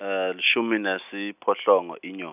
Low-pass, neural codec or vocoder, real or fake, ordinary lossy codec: 3.6 kHz; none; real; none